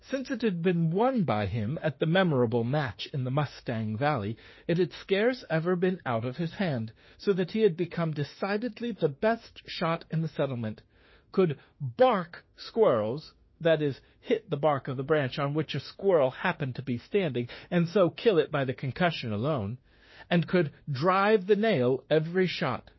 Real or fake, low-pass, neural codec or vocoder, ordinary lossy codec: fake; 7.2 kHz; autoencoder, 48 kHz, 32 numbers a frame, DAC-VAE, trained on Japanese speech; MP3, 24 kbps